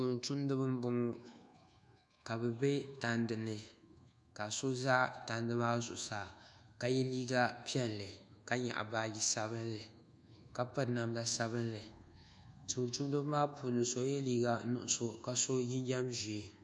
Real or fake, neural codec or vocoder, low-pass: fake; codec, 24 kHz, 1.2 kbps, DualCodec; 10.8 kHz